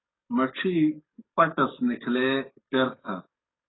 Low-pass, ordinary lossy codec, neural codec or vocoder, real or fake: 7.2 kHz; AAC, 16 kbps; codec, 44.1 kHz, 7.8 kbps, DAC; fake